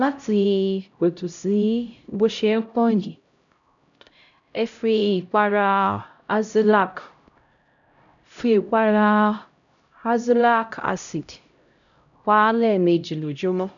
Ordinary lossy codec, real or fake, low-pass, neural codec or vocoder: none; fake; 7.2 kHz; codec, 16 kHz, 0.5 kbps, X-Codec, HuBERT features, trained on LibriSpeech